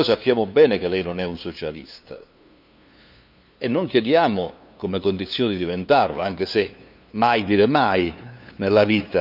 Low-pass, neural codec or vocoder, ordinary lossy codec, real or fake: 5.4 kHz; codec, 16 kHz, 2 kbps, FunCodec, trained on LibriTTS, 25 frames a second; none; fake